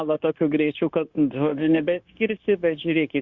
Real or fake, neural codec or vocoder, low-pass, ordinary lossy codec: fake; codec, 16 kHz, 0.9 kbps, LongCat-Audio-Codec; 7.2 kHz; Opus, 64 kbps